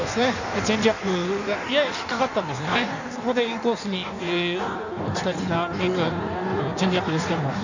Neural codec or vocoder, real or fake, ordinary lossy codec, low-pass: codec, 16 kHz in and 24 kHz out, 1.1 kbps, FireRedTTS-2 codec; fake; none; 7.2 kHz